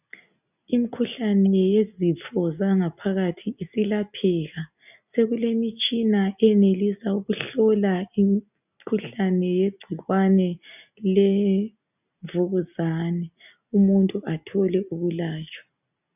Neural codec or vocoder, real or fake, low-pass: none; real; 3.6 kHz